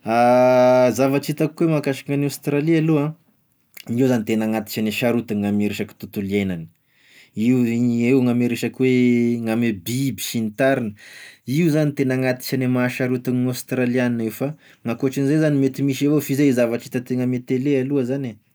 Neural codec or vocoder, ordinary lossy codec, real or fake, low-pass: none; none; real; none